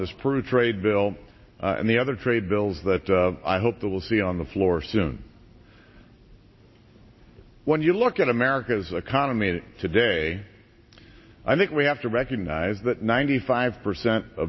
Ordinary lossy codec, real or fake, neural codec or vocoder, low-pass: MP3, 24 kbps; real; none; 7.2 kHz